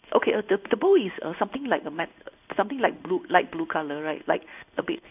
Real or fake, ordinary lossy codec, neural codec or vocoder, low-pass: fake; none; codec, 16 kHz in and 24 kHz out, 1 kbps, XY-Tokenizer; 3.6 kHz